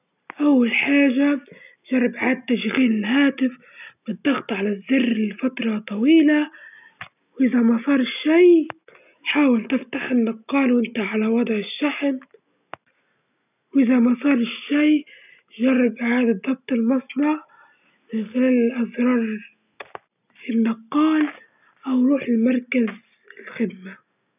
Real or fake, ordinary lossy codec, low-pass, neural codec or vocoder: real; none; 3.6 kHz; none